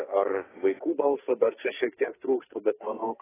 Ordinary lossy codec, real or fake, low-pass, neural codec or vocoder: AAC, 16 kbps; fake; 3.6 kHz; codec, 44.1 kHz, 2.6 kbps, SNAC